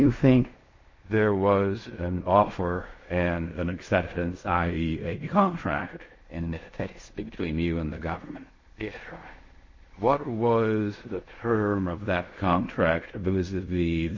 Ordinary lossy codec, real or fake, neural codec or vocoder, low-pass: MP3, 32 kbps; fake; codec, 16 kHz in and 24 kHz out, 0.4 kbps, LongCat-Audio-Codec, fine tuned four codebook decoder; 7.2 kHz